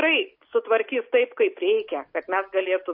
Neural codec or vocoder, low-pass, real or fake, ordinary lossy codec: none; 5.4 kHz; real; MP3, 32 kbps